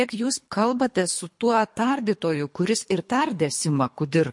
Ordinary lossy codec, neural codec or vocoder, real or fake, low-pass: MP3, 48 kbps; codec, 24 kHz, 3 kbps, HILCodec; fake; 10.8 kHz